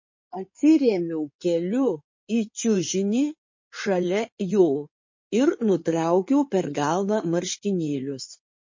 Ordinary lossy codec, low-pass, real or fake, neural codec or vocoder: MP3, 32 kbps; 7.2 kHz; fake; codec, 16 kHz in and 24 kHz out, 2.2 kbps, FireRedTTS-2 codec